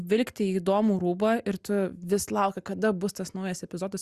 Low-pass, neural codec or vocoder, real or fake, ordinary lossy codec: 14.4 kHz; none; real; Opus, 64 kbps